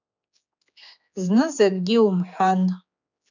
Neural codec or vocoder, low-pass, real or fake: codec, 16 kHz, 4 kbps, X-Codec, HuBERT features, trained on general audio; 7.2 kHz; fake